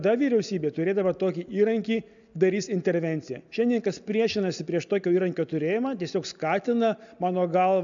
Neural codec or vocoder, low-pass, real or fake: none; 7.2 kHz; real